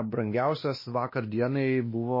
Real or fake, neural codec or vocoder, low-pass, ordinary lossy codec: fake; codec, 16 kHz, 1 kbps, X-Codec, WavLM features, trained on Multilingual LibriSpeech; 5.4 kHz; MP3, 24 kbps